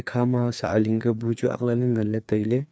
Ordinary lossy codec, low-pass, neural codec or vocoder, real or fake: none; none; codec, 16 kHz, 2 kbps, FreqCodec, larger model; fake